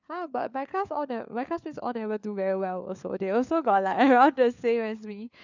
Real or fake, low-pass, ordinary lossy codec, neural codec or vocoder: fake; 7.2 kHz; none; codec, 16 kHz, 4 kbps, FunCodec, trained on LibriTTS, 50 frames a second